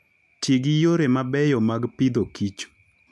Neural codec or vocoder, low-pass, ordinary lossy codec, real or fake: none; none; none; real